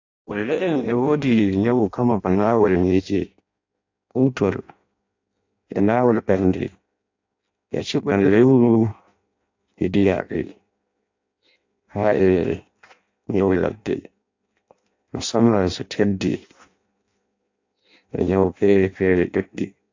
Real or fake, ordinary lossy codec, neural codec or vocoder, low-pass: fake; AAC, 48 kbps; codec, 16 kHz in and 24 kHz out, 0.6 kbps, FireRedTTS-2 codec; 7.2 kHz